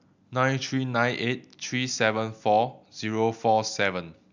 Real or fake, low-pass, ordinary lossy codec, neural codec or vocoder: real; 7.2 kHz; none; none